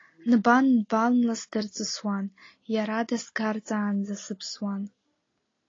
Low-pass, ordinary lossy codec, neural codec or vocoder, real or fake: 7.2 kHz; AAC, 32 kbps; none; real